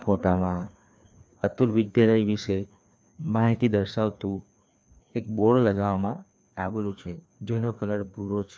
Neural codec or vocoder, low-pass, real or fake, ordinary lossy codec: codec, 16 kHz, 2 kbps, FreqCodec, larger model; none; fake; none